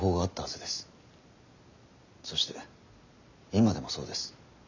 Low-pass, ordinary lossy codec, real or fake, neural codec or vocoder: 7.2 kHz; none; real; none